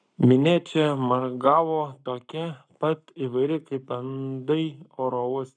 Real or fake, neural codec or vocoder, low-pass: fake; codec, 44.1 kHz, 7.8 kbps, Pupu-Codec; 9.9 kHz